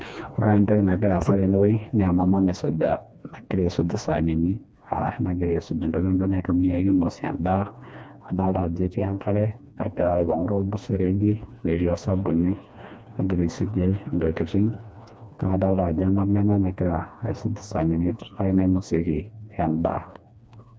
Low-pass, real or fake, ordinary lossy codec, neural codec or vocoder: none; fake; none; codec, 16 kHz, 2 kbps, FreqCodec, smaller model